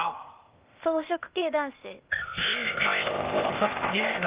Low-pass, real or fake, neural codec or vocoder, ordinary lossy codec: 3.6 kHz; fake; codec, 16 kHz, 0.8 kbps, ZipCodec; Opus, 32 kbps